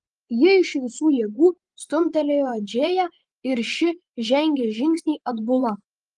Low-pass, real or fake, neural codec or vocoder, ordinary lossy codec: 10.8 kHz; fake; vocoder, 24 kHz, 100 mel bands, Vocos; Opus, 24 kbps